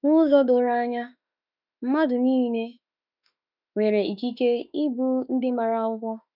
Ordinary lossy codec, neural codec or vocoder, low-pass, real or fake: none; autoencoder, 48 kHz, 32 numbers a frame, DAC-VAE, trained on Japanese speech; 5.4 kHz; fake